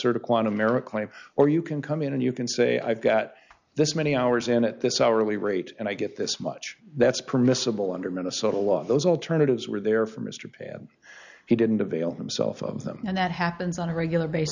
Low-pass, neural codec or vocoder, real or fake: 7.2 kHz; none; real